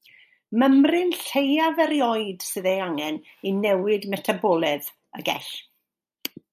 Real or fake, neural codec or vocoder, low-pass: real; none; 14.4 kHz